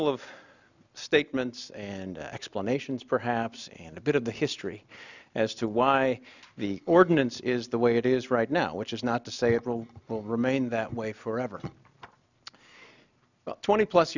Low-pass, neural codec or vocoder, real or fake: 7.2 kHz; none; real